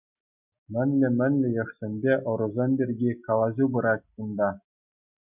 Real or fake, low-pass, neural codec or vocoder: real; 3.6 kHz; none